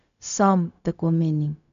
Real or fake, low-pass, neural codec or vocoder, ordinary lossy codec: fake; 7.2 kHz; codec, 16 kHz, 0.4 kbps, LongCat-Audio-Codec; MP3, 96 kbps